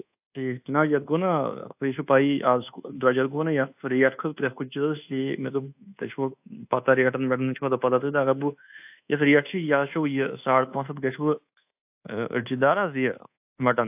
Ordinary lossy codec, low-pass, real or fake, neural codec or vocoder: AAC, 32 kbps; 3.6 kHz; fake; codec, 24 kHz, 1.2 kbps, DualCodec